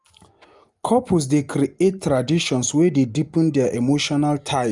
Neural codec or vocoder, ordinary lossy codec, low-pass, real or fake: none; Opus, 32 kbps; 10.8 kHz; real